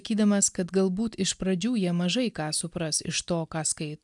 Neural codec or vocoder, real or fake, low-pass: none; real; 10.8 kHz